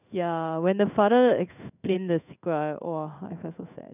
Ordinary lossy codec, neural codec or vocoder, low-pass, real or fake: none; codec, 16 kHz in and 24 kHz out, 1 kbps, XY-Tokenizer; 3.6 kHz; fake